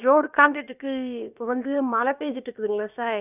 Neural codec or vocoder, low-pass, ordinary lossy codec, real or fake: codec, 16 kHz, about 1 kbps, DyCAST, with the encoder's durations; 3.6 kHz; none; fake